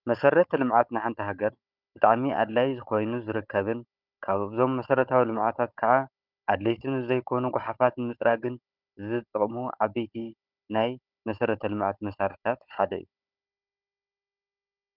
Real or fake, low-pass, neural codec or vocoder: fake; 5.4 kHz; codec, 16 kHz, 16 kbps, FunCodec, trained on Chinese and English, 50 frames a second